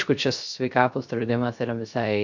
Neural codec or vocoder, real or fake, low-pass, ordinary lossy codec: codec, 16 kHz, 0.3 kbps, FocalCodec; fake; 7.2 kHz; MP3, 64 kbps